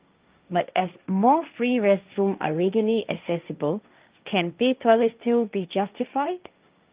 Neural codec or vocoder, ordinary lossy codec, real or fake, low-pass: codec, 16 kHz, 1.1 kbps, Voila-Tokenizer; Opus, 24 kbps; fake; 3.6 kHz